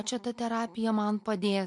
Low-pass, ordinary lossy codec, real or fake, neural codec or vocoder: 10.8 kHz; MP3, 64 kbps; real; none